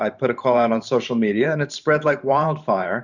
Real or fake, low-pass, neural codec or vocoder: fake; 7.2 kHz; vocoder, 44.1 kHz, 128 mel bands every 512 samples, BigVGAN v2